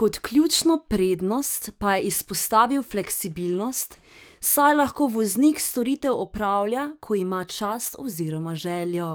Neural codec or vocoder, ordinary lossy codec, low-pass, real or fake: codec, 44.1 kHz, 7.8 kbps, DAC; none; none; fake